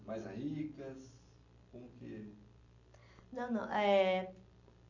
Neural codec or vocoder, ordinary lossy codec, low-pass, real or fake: none; none; 7.2 kHz; real